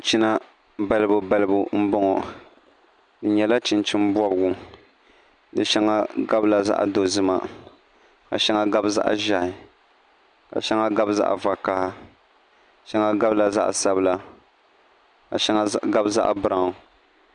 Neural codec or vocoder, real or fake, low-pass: none; real; 9.9 kHz